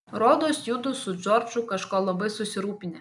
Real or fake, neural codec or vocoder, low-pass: real; none; 10.8 kHz